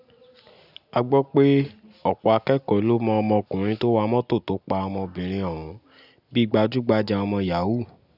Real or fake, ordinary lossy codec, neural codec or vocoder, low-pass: real; none; none; 5.4 kHz